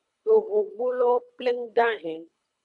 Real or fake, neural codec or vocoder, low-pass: fake; codec, 24 kHz, 3 kbps, HILCodec; 10.8 kHz